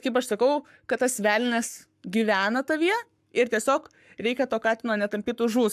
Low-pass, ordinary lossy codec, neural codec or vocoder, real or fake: 14.4 kHz; AAC, 96 kbps; codec, 44.1 kHz, 7.8 kbps, Pupu-Codec; fake